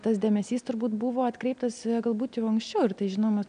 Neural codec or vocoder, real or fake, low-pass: none; real; 9.9 kHz